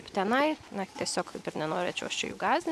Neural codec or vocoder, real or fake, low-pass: none; real; 14.4 kHz